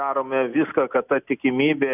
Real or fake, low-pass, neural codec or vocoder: real; 3.6 kHz; none